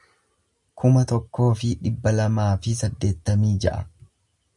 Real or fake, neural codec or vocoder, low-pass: real; none; 10.8 kHz